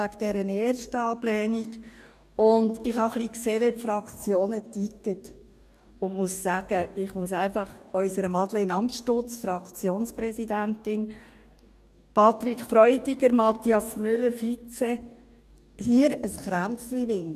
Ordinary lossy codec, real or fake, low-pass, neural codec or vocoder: none; fake; 14.4 kHz; codec, 44.1 kHz, 2.6 kbps, DAC